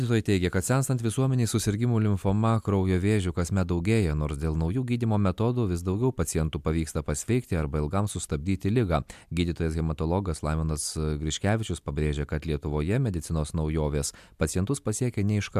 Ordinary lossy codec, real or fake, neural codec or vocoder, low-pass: MP3, 96 kbps; real; none; 14.4 kHz